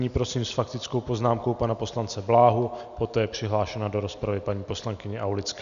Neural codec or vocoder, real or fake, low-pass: none; real; 7.2 kHz